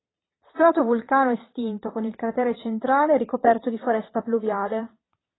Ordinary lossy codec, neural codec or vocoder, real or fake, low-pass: AAC, 16 kbps; vocoder, 22.05 kHz, 80 mel bands, Vocos; fake; 7.2 kHz